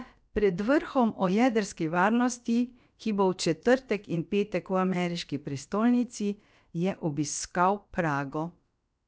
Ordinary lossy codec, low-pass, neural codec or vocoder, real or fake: none; none; codec, 16 kHz, about 1 kbps, DyCAST, with the encoder's durations; fake